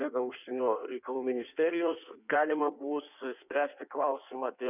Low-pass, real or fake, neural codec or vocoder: 3.6 kHz; fake; codec, 16 kHz in and 24 kHz out, 1.1 kbps, FireRedTTS-2 codec